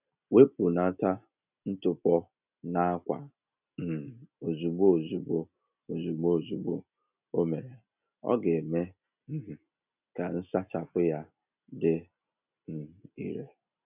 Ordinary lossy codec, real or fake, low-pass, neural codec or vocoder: none; real; 3.6 kHz; none